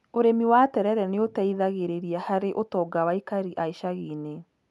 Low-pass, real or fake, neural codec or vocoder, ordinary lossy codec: none; real; none; none